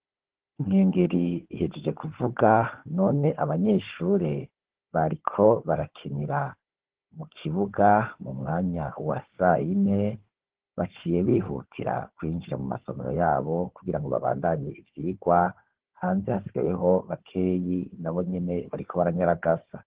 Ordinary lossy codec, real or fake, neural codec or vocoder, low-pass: Opus, 16 kbps; fake; codec, 16 kHz, 4 kbps, FunCodec, trained on Chinese and English, 50 frames a second; 3.6 kHz